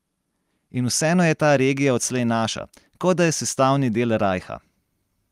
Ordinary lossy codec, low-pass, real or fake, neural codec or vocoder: Opus, 32 kbps; 14.4 kHz; real; none